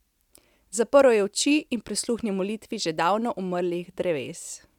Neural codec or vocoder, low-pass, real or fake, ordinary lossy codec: none; 19.8 kHz; real; none